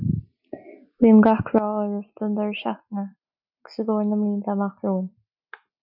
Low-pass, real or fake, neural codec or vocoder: 5.4 kHz; real; none